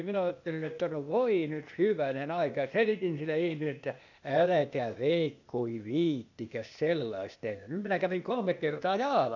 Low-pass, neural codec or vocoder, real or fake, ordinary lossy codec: 7.2 kHz; codec, 16 kHz, 0.8 kbps, ZipCodec; fake; none